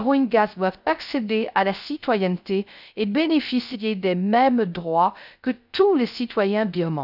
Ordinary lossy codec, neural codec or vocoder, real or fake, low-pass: none; codec, 16 kHz, 0.3 kbps, FocalCodec; fake; 5.4 kHz